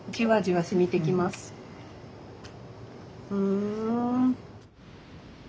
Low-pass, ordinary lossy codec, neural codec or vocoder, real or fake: none; none; none; real